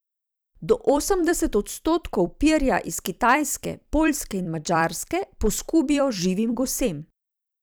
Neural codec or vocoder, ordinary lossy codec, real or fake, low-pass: vocoder, 44.1 kHz, 128 mel bands every 256 samples, BigVGAN v2; none; fake; none